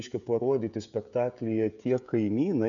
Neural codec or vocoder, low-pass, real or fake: codec, 16 kHz, 16 kbps, FreqCodec, smaller model; 7.2 kHz; fake